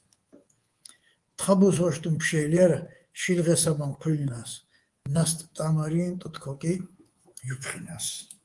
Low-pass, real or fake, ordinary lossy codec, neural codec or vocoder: 10.8 kHz; fake; Opus, 24 kbps; codec, 24 kHz, 3.1 kbps, DualCodec